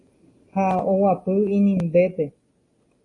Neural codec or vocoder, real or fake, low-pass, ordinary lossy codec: none; real; 10.8 kHz; AAC, 32 kbps